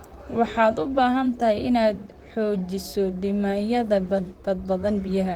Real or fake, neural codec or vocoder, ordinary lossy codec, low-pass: fake; vocoder, 44.1 kHz, 128 mel bands, Pupu-Vocoder; Opus, 32 kbps; 19.8 kHz